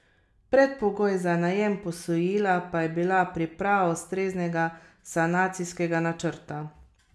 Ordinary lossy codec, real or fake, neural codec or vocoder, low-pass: none; real; none; none